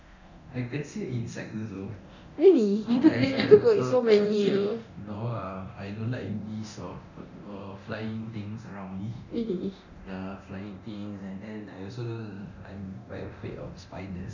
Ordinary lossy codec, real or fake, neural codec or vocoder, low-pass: none; fake; codec, 24 kHz, 0.9 kbps, DualCodec; 7.2 kHz